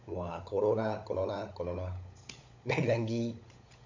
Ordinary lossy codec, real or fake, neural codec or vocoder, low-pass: AAC, 48 kbps; fake; codec, 16 kHz, 16 kbps, FunCodec, trained on LibriTTS, 50 frames a second; 7.2 kHz